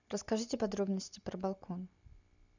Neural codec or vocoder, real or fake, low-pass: none; real; 7.2 kHz